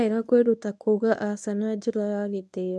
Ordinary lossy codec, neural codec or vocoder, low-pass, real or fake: none; codec, 24 kHz, 0.9 kbps, WavTokenizer, medium speech release version 1; none; fake